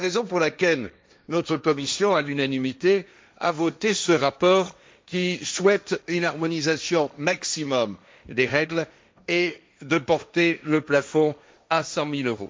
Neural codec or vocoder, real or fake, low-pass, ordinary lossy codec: codec, 16 kHz, 1.1 kbps, Voila-Tokenizer; fake; none; none